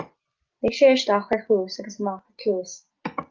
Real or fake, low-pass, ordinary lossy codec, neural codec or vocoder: real; 7.2 kHz; Opus, 24 kbps; none